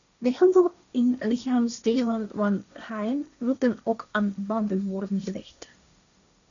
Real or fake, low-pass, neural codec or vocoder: fake; 7.2 kHz; codec, 16 kHz, 1.1 kbps, Voila-Tokenizer